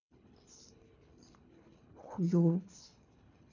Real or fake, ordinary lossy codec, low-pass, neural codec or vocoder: fake; none; 7.2 kHz; codec, 24 kHz, 3 kbps, HILCodec